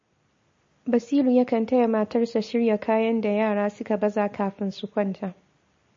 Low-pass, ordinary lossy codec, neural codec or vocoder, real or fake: 7.2 kHz; MP3, 32 kbps; none; real